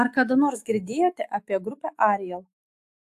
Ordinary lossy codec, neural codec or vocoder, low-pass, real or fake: AAC, 64 kbps; autoencoder, 48 kHz, 128 numbers a frame, DAC-VAE, trained on Japanese speech; 14.4 kHz; fake